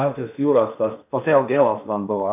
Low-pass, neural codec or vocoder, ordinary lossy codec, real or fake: 3.6 kHz; codec, 16 kHz in and 24 kHz out, 0.8 kbps, FocalCodec, streaming, 65536 codes; AAC, 32 kbps; fake